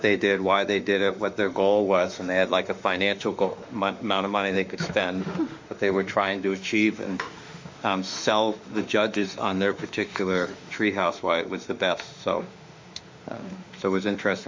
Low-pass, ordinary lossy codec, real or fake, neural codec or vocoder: 7.2 kHz; MP3, 48 kbps; fake; autoencoder, 48 kHz, 32 numbers a frame, DAC-VAE, trained on Japanese speech